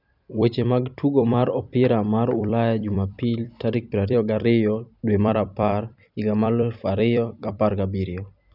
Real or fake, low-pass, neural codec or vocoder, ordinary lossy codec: fake; 5.4 kHz; vocoder, 44.1 kHz, 128 mel bands every 256 samples, BigVGAN v2; none